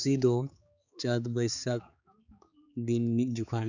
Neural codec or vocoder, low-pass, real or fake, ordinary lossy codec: codec, 16 kHz, 4 kbps, X-Codec, HuBERT features, trained on balanced general audio; 7.2 kHz; fake; none